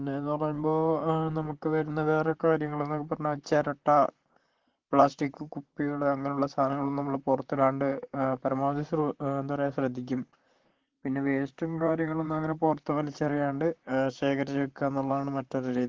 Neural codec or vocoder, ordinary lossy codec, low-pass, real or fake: vocoder, 44.1 kHz, 128 mel bands, Pupu-Vocoder; Opus, 16 kbps; 7.2 kHz; fake